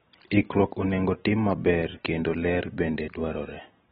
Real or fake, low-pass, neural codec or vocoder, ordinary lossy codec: real; 9.9 kHz; none; AAC, 16 kbps